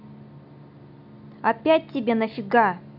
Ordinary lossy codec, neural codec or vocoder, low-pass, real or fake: none; none; 5.4 kHz; real